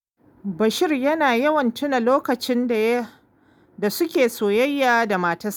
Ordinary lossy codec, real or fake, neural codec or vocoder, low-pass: none; real; none; none